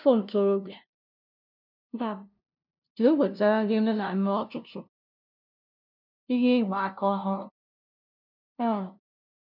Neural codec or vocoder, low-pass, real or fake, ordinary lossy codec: codec, 16 kHz, 0.5 kbps, FunCodec, trained on LibriTTS, 25 frames a second; 5.4 kHz; fake; none